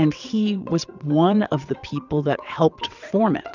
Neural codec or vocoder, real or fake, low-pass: vocoder, 22.05 kHz, 80 mel bands, WaveNeXt; fake; 7.2 kHz